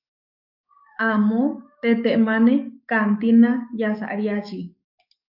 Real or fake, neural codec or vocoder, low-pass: fake; codec, 44.1 kHz, 7.8 kbps, DAC; 5.4 kHz